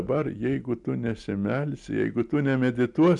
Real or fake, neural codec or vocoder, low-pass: real; none; 10.8 kHz